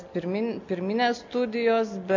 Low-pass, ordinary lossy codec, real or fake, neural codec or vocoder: 7.2 kHz; AAC, 48 kbps; real; none